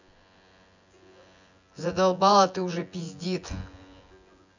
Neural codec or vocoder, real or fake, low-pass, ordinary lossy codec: vocoder, 24 kHz, 100 mel bands, Vocos; fake; 7.2 kHz; none